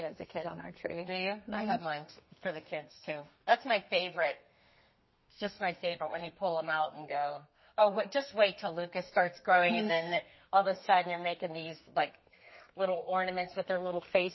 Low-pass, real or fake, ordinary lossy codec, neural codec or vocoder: 7.2 kHz; fake; MP3, 24 kbps; codec, 32 kHz, 1.9 kbps, SNAC